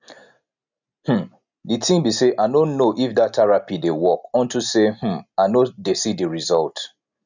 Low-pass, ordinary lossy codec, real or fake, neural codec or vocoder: 7.2 kHz; none; real; none